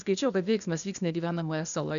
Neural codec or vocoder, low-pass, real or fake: codec, 16 kHz, 0.8 kbps, ZipCodec; 7.2 kHz; fake